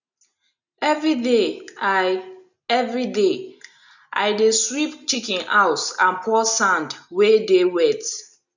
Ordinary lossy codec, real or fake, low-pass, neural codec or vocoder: none; real; 7.2 kHz; none